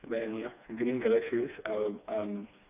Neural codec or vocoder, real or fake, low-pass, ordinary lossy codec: codec, 16 kHz, 2 kbps, FreqCodec, smaller model; fake; 3.6 kHz; none